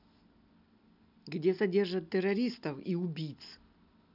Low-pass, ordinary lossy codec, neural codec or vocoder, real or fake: 5.4 kHz; none; none; real